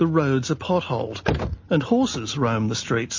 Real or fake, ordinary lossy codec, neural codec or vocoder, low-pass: real; MP3, 32 kbps; none; 7.2 kHz